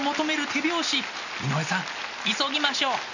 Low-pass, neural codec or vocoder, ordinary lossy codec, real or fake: 7.2 kHz; none; none; real